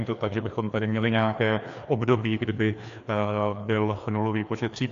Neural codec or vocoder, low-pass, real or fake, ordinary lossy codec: codec, 16 kHz, 2 kbps, FreqCodec, larger model; 7.2 kHz; fake; AAC, 64 kbps